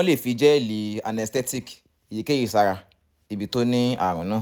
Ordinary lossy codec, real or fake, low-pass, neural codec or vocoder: none; real; none; none